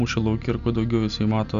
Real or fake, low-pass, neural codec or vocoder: real; 7.2 kHz; none